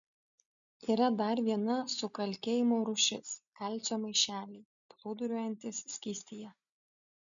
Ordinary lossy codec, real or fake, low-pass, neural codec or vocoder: MP3, 96 kbps; real; 7.2 kHz; none